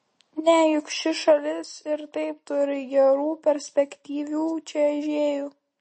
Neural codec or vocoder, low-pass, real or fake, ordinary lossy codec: none; 10.8 kHz; real; MP3, 32 kbps